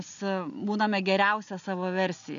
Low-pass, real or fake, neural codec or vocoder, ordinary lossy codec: 7.2 kHz; real; none; MP3, 96 kbps